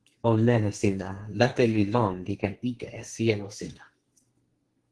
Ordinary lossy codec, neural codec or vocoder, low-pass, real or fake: Opus, 16 kbps; codec, 32 kHz, 1.9 kbps, SNAC; 10.8 kHz; fake